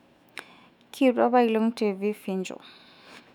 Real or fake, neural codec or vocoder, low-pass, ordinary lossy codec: fake; autoencoder, 48 kHz, 128 numbers a frame, DAC-VAE, trained on Japanese speech; 19.8 kHz; none